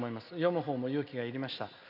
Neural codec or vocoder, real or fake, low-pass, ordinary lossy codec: none; real; 5.4 kHz; none